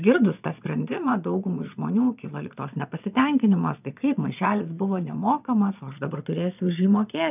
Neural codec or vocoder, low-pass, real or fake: vocoder, 22.05 kHz, 80 mel bands, Vocos; 3.6 kHz; fake